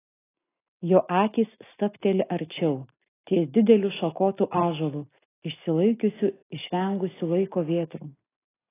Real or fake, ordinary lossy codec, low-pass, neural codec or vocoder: real; AAC, 16 kbps; 3.6 kHz; none